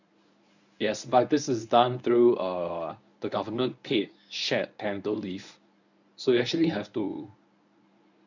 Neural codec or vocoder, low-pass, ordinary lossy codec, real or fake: codec, 24 kHz, 0.9 kbps, WavTokenizer, medium speech release version 1; 7.2 kHz; none; fake